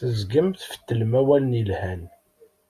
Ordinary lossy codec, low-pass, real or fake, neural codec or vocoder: Opus, 64 kbps; 14.4 kHz; real; none